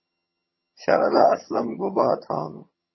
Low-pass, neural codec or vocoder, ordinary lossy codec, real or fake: 7.2 kHz; vocoder, 22.05 kHz, 80 mel bands, HiFi-GAN; MP3, 24 kbps; fake